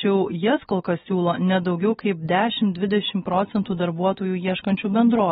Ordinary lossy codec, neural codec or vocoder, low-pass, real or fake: AAC, 16 kbps; none; 7.2 kHz; real